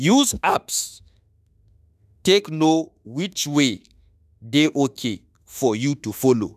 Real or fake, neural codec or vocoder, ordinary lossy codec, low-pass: fake; autoencoder, 48 kHz, 32 numbers a frame, DAC-VAE, trained on Japanese speech; none; 14.4 kHz